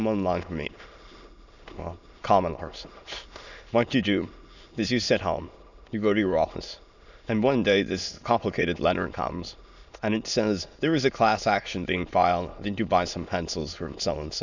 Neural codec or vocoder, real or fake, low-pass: autoencoder, 22.05 kHz, a latent of 192 numbers a frame, VITS, trained on many speakers; fake; 7.2 kHz